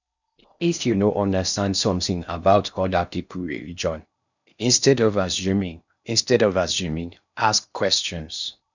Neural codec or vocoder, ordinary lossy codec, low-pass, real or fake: codec, 16 kHz in and 24 kHz out, 0.6 kbps, FocalCodec, streaming, 4096 codes; none; 7.2 kHz; fake